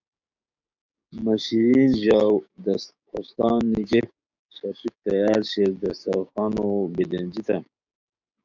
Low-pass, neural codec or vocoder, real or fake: 7.2 kHz; codec, 16 kHz, 6 kbps, DAC; fake